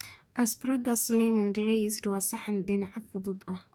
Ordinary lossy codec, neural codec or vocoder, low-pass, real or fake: none; codec, 44.1 kHz, 2.6 kbps, DAC; none; fake